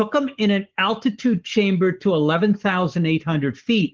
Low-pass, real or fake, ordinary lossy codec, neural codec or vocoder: 7.2 kHz; fake; Opus, 16 kbps; codec, 44.1 kHz, 7.8 kbps, Pupu-Codec